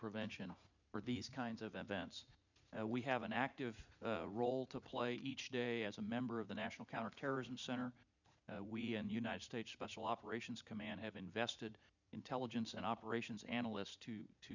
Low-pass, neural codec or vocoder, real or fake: 7.2 kHz; vocoder, 44.1 kHz, 80 mel bands, Vocos; fake